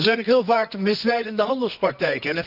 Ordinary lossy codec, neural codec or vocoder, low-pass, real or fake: none; codec, 24 kHz, 0.9 kbps, WavTokenizer, medium music audio release; 5.4 kHz; fake